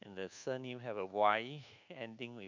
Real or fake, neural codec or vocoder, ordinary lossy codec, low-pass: fake; codec, 24 kHz, 1.2 kbps, DualCodec; MP3, 64 kbps; 7.2 kHz